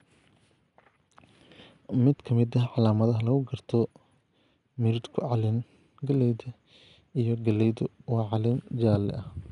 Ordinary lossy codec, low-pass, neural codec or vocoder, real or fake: none; 10.8 kHz; none; real